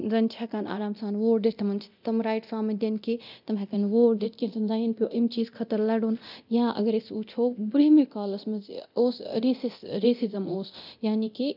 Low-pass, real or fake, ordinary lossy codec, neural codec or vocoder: 5.4 kHz; fake; none; codec, 24 kHz, 0.9 kbps, DualCodec